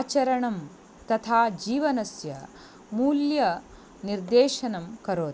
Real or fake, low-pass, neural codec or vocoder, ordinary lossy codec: real; none; none; none